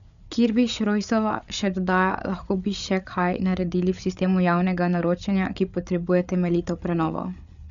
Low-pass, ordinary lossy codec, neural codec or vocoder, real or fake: 7.2 kHz; none; codec, 16 kHz, 8 kbps, FreqCodec, larger model; fake